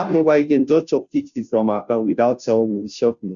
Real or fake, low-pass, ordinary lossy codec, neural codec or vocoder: fake; 7.2 kHz; none; codec, 16 kHz, 0.5 kbps, FunCodec, trained on Chinese and English, 25 frames a second